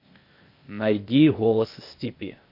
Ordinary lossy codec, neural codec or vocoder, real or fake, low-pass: MP3, 32 kbps; codec, 16 kHz, 0.8 kbps, ZipCodec; fake; 5.4 kHz